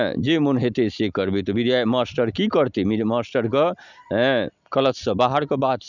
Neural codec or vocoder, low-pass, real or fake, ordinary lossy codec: none; 7.2 kHz; real; none